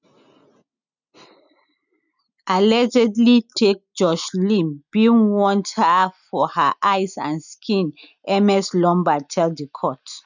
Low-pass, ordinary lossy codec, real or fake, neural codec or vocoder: 7.2 kHz; none; real; none